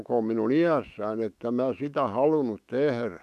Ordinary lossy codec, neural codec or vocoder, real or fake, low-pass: none; autoencoder, 48 kHz, 128 numbers a frame, DAC-VAE, trained on Japanese speech; fake; 14.4 kHz